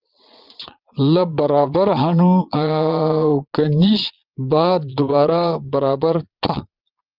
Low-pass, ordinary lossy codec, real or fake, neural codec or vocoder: 5.4 kHz; Opus, 24 kbps; fake; vocoder, 22.05 kHz, 80 mel bands, Vocos